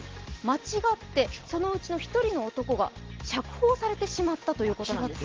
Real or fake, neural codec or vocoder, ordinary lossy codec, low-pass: real; none; Opus, 16 kbps; 7.2 kHz